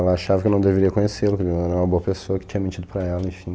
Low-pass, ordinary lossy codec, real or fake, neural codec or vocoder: none; none; real; none